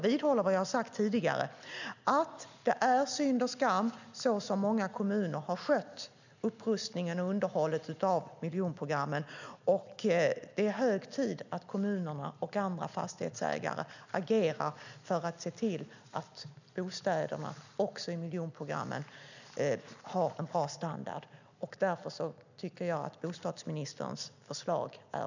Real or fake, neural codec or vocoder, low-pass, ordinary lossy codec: real; none; 7.2 kHz; none